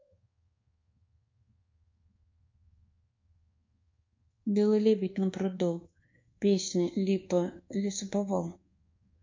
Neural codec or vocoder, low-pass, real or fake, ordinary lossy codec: codec, 24 kHz, 1.2 kbps, DualCodec; 7.2 kHz; fake; MP3, 48 kbps